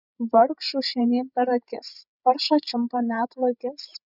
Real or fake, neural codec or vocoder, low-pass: fake; codec, 16 kHz, 16 kbps, FreqCodec, larger model; 5.4 kHz